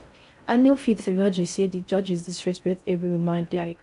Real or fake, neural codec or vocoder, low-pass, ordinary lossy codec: fake; codec, 16 kHz in and 24 kHz out, 0.6 kbps, FocalCodec, streaming, 4096 codes; 10.8 kHz; none